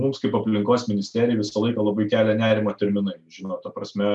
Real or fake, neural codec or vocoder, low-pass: real; none; 10.8 kHz